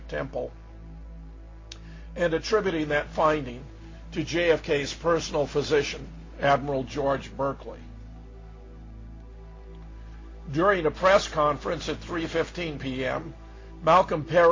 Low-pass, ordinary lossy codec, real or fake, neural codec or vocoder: 7.2 kHz; MP3, 48 kbps; real; none